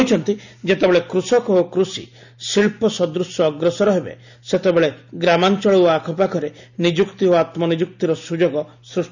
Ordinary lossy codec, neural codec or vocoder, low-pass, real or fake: none; none; 7.2 kHz; real